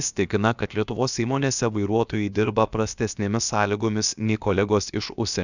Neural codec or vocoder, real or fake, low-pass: codec, 16 kHz, about 1 kbps, DyCAST, with the encoder's durations; fake; 7.2 kHz